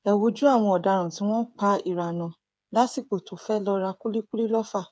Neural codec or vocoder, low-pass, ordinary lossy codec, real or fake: codec, 16 kHz, 8 kbps, FreqCodec, smaller model; none; none; fake